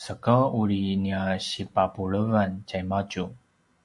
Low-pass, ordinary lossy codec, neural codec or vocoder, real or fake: 10.8 kHz; AAC, 64 kbps; none; real